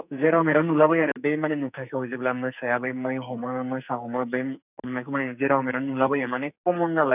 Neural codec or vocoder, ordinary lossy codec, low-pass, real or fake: codec, 44.1 kHz, 2.6 kbps, SNAC; none; 3.6 kHz; fake